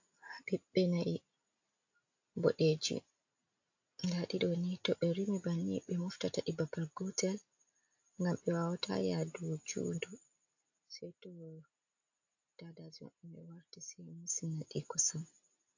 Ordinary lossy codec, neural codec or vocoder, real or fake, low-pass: AAC, 48 kbps; none; real; 7.2 kHz